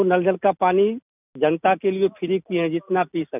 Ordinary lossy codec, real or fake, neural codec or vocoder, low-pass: none; real; none; 3.6 kHz